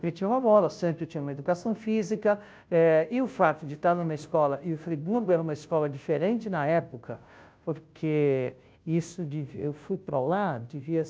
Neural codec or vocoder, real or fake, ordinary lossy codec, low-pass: codec, 16 kHz, 0.5 kbps, FunCodec, trained on Chinese and English, 25 frames a second; fake; none; none